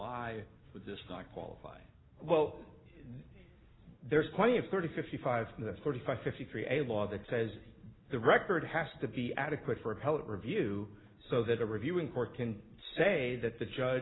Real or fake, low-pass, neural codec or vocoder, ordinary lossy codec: real; 7.2 kHz; none; AAC, 16 kbps